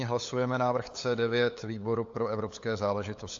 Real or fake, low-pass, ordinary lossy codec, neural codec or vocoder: fake; 7.2 kHz; AAC, 48 kbps; codec, 16 kHz, 8 kbps, FunCodec, trained on LibriTTS, 25 frames a second